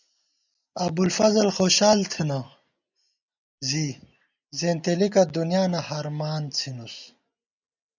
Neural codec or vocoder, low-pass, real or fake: none; 7.2 kHz; real